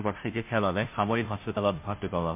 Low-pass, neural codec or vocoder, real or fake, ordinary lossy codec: 3.6 kHz; codec, 16 kHz, 0.5 kbps, FunCodec, trained on Chinese and English, 25 frames a second; fake; MP3, 32 kbps